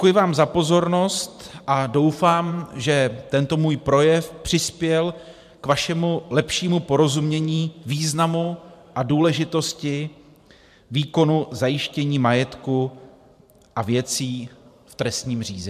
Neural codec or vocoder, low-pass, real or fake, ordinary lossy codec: vocoder, 44.1 kHz, 128 mel bands every 512 samples, BigVGAN v2; 14.4 kHz; fake; MP3, 96 kbps